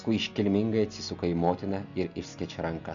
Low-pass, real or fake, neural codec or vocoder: 7.2 kHz; real; none